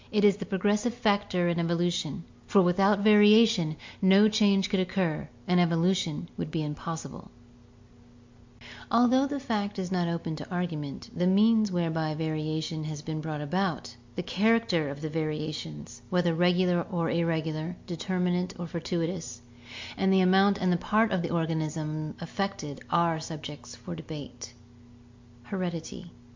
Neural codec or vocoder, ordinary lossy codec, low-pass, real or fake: none; MP3, 48 kbps; 7.2 kHz; real